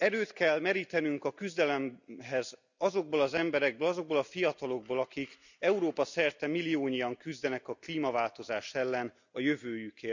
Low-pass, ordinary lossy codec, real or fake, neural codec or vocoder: 7.2 kHz; none; real; none